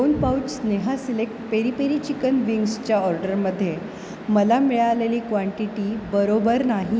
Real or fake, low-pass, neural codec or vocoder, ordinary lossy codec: real; none; none; none